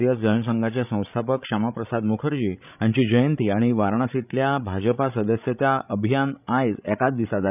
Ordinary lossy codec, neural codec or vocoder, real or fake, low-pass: none; codec, 16 kHz, 16 kbps, FreqCodec, larger model; fake; 3.6 kHz